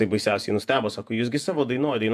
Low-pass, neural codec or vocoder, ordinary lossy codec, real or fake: 14.4 kHz; vocoder, 48 kHz, 128 mel bands, Vocos; AAC, 96 kbps; fake